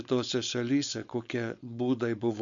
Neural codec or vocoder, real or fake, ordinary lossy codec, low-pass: codec, 16 kHz, 4.8 kbps, FACodec; fake; MP3, 64 kbps; 7.2 kHz